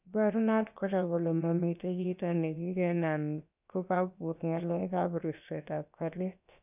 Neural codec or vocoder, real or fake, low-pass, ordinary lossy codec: codec, 16 kHz, about 1 kbps, DyCAST, with the encoder's durations; fake; 3.6 kHz; none